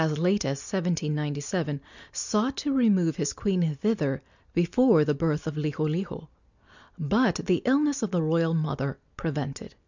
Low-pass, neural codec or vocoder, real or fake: 7.2 kHz; none; real